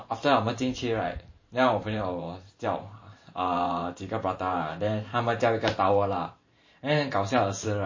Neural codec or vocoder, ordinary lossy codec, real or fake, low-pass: vocoder, 44.1 kHz, 128 mel bands every 512 samples, BigVGAN v2; MP3, 32 kbps; fake; 7.2 kHz